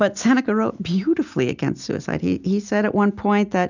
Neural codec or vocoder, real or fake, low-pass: none; real; 7.2 kHz